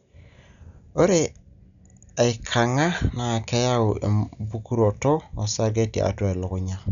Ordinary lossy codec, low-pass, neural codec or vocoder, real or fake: none; 7.2 kHz; none; real